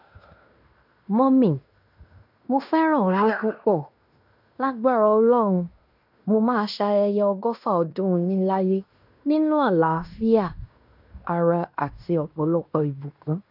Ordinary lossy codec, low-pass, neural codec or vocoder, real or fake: none; 5.4 kHz; codec, 16 kHz in and 24 kHz out, 0.9 kbps, LongCat-Audio-Codec, fine tuned four codebook decoder; fake